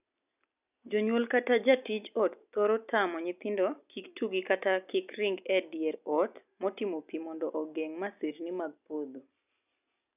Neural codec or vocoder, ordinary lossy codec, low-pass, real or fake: none; none; 3.6 kHz; real